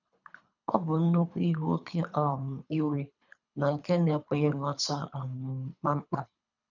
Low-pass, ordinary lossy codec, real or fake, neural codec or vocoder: 7.2 kHz; none; fake; codec, 24 kHz, 3 kbps, HILCodec